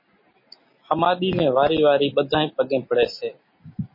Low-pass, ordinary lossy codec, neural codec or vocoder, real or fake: 5.4 kHz; MP3, 24 kbps; none; real